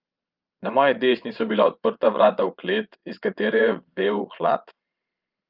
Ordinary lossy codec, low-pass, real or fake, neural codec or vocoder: Opus, 24 kbps; 5.4 kHz; fake; vocoder, 22.05 kHz, 80 mel bands, Vocos